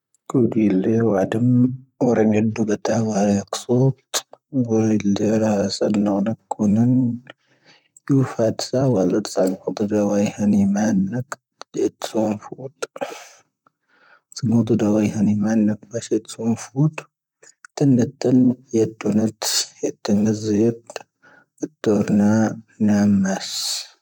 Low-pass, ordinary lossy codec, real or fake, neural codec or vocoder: 19.8 kHz; none; fake; vocoder, 44.1 kHz, 128 mel bands, Pupu-Vocoder